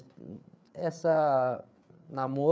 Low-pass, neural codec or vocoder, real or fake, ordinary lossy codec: none; codec, 16 kHz, 8 kbps, FreqCodec, larger model; fake; none